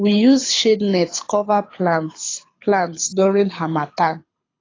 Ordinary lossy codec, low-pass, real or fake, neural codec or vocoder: AAC, 32 kbps; 7.2 kHz; fake; codec, 24 kHz, 6 kbps, HILCodec